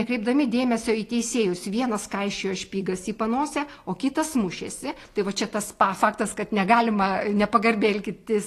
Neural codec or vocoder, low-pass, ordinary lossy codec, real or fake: none; 14.4 kHz; AAC, 48 kbps; real